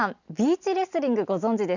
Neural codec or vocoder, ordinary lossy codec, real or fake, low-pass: none; none; real; 7.2 kHz